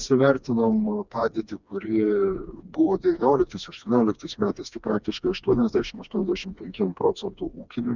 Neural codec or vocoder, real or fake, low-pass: codec, 16 kHz, 2 kbps, FreqCodec, smaller model; fake; 7.2 kHz